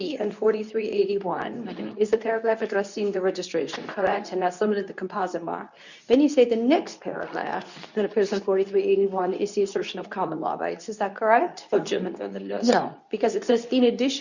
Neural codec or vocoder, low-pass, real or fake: codec, 24 kHz, 0.9 kbps, WavTokenizer, medium speech release version 2; 7.2 kHz; fake